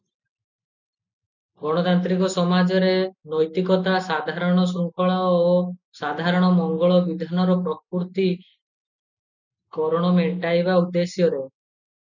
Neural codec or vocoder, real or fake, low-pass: none; real; 7.2 kHz